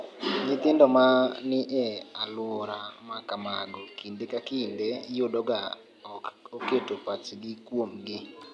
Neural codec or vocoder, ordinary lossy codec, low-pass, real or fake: none; none; none; real